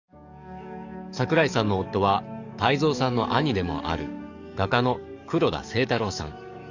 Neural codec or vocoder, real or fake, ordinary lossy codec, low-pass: codec, 44.1 kHz, 7.8 kbps, DAC; fake; none; 7.2 kHz